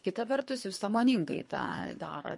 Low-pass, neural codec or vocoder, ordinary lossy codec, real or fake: 10.8 kHz; codec, 24 kHz, 3 kbps, HILCodec; MP3, 48 kbps; fake